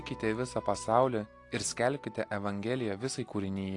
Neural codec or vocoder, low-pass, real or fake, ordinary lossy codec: none; 10.8 kHz; real; AAC, 48 kbps